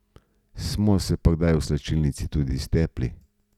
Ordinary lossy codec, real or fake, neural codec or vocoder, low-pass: none; real; none; 19.8 kHz